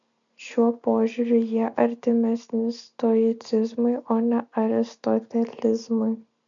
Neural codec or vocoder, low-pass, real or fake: none; 7.2 kHz; real